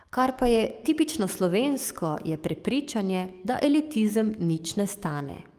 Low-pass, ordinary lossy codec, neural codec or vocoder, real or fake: 14.4 kHz; Opus, 32 kbps; codec, 44.1 kHz, 7.8 kbps, DAC; fake